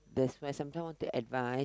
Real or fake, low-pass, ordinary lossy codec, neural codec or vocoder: real; none; none; none